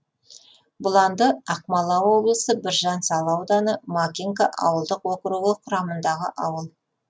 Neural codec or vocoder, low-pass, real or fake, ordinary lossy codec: none; none; real; none